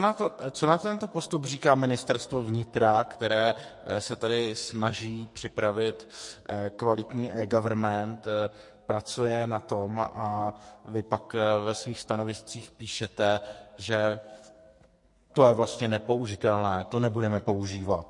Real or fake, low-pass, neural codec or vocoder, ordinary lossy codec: fake; 10.8 kHz; codec, 44.1 kHz, 2.6 kbps, SNAC; MP3, 48 kbps